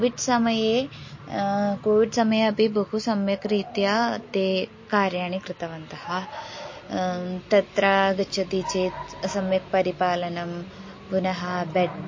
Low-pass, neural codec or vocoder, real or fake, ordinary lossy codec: 7.2 kHz; none; real; MP3, 32 kbps